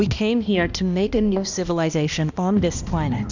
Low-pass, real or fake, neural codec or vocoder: 7.2 kHz; fake; codec, 16 kHz, 1 kbps, X-Codec, HuBERT features, trained on balanced general audio